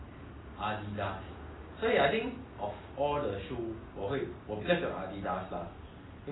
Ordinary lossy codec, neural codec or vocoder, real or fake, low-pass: AAC, 16 kbps; none; real; 7.2 kHz